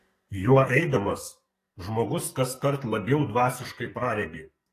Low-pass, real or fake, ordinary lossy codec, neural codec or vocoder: 14.4 kHz; fake; AAC, 48 kbps; codec, 44.1 kHz, 2.6 kbps, SNAC